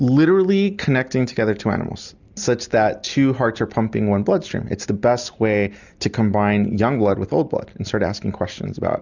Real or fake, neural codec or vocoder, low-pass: real; none; 7.2 kHz